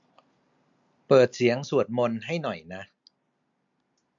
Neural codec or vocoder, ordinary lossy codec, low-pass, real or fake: none; MP3, 64 kbps; 7.2 kHz; real